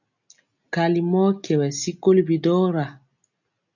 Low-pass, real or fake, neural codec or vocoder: 7.2 kHz; real; none